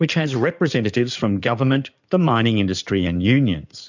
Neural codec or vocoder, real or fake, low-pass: codec, 16 kHz in and 24 kHz out, 2.2 kbps, FireRedTTS-2 codec; fake; 7.2 kHz